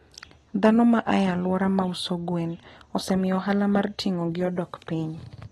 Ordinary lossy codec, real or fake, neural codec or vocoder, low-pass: AAC, 32 kbps; real; none; 19.8 kHz